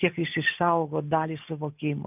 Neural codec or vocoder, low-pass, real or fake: none; 3.6 kHz; real